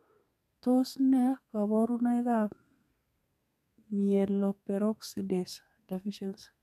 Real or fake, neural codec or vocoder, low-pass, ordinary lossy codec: fake; codec, 32 kHz, 1.9 kbps, SNAC; 14.4 kHz; none